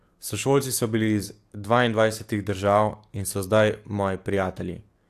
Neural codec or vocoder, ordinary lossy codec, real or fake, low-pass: codec, 44.1 kHz, 7.8 kbps, DAC; AAC, 64 kbps; fake; 14.4 kHz